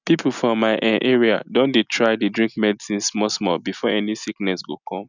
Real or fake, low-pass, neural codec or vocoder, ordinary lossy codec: real; 7.2 kHz; none; none